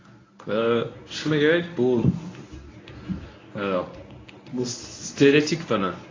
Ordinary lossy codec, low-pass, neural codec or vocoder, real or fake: AAC, 32 kbps; 7.2 kHz; codec, 24 kHz, 0.9 kbps, WavTokenizer, medium speech release version 1; fake